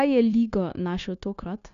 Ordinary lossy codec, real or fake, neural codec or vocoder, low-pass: none; fake; codec, 16 kHz, 0.9 kbps, LongCat-Audio-Codec; 7.2 kHz